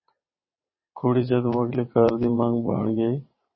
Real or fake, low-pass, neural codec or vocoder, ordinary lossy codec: fake; 7.2 kHz; vocoder, 22.05 kHz, 80 mel bands, WaveNeXt; MP3, 24 kbps